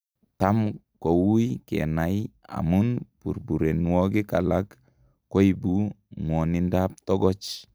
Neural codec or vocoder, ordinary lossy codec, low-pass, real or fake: none; none; none; real